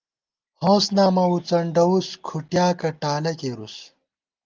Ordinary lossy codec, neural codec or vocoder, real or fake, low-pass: Opus, 32 kbps; none; real; 7.2 kHz